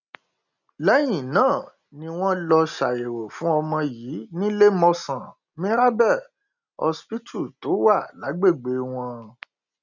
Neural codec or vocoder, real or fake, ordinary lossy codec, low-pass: none; real; none; 7.2 kHz